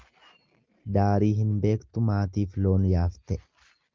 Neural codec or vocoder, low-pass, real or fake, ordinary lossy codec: none; 7.2 kHz; real; Opus, 32 kbps